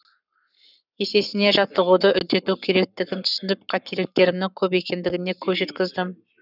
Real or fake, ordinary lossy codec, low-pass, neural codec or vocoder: fake; none; 5.4 kHz; codec, 44.1 kHz, 7.8 kbps, Pupu-Codec